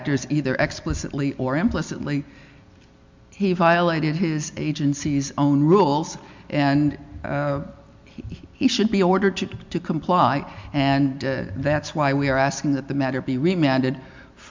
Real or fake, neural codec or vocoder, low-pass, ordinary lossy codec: real; none; 7.2 kHz; MP3, 64 kbps